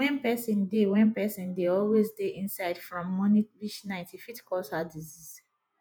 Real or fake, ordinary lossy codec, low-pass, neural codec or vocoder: fake; none; none; vocoder, 48 kHz, 128 mel bands, Vocos